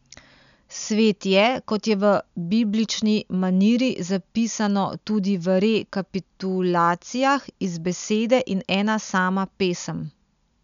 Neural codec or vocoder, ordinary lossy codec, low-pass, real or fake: none; none; 7.2 kHz; real